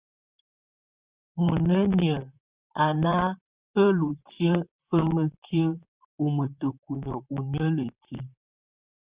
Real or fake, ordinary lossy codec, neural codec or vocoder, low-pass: fake; Opus, 64 kbps; vocoder, 44.1 kHz, 128 mel bands, Pupu-Vocoder; 3.6 kHz